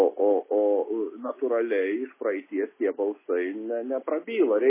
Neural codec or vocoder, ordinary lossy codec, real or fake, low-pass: none; MP3, 16 kbps; real; 3.6 kHz